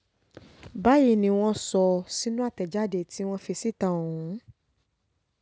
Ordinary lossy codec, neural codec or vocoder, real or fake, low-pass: none; none; real; none